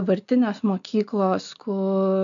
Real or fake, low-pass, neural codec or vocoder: real; 7.2 kHz; none